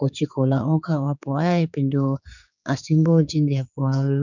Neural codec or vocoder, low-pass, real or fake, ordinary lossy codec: codec, 16 kHz, 4 kbps, X-Codec, HuBERT features, trained on general audio; 7.2 kHz; fake; none